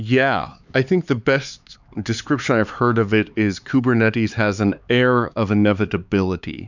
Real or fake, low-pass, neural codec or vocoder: fake; 7.2 kHz; codec, 16 kHz, 4 kbps, X-Codec, HuBERT features, trained on LibriSpeech